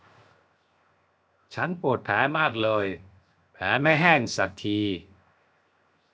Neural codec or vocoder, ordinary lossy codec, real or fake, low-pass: codec, 16 kHz, 0.7 kbps, FocalCodec; none; fake; none